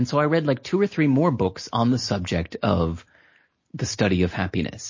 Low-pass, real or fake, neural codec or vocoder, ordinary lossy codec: 7.2 kHz; real; none; MP3, 32 kbps